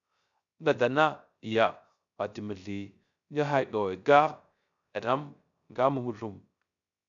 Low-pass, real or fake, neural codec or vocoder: 7.2 kHz; fake; codec, 16 kHz, 0.3 kbps, FocalCodec